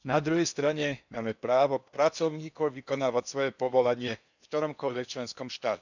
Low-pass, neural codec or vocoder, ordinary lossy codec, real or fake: 7.2 kHz; codec, 16 kHz in and 24 kHz out, 0.8 kbps, FocalCodec, streaming, 65536 codes; none; fake